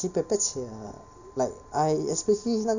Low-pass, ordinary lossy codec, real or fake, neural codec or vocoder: 7.2 kHz; none; real; none